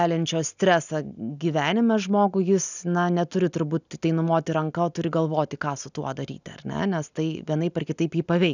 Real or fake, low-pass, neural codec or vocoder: real; 7.2 kHz; none